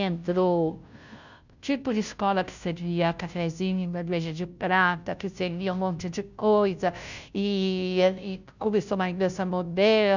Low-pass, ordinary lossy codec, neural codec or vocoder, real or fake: 7.2 kHz; none; codec, 16 kHz, 0.5 kbps, FunCodec, trained on Chinese and English, 25 frames a second; fake